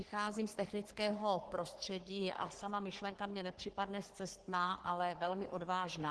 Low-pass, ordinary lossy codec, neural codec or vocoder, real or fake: 10.8 kHz; Opus, 16 kbps; codec, 44.1 kHz, 3.4 kbps, Pupu-Codec; fake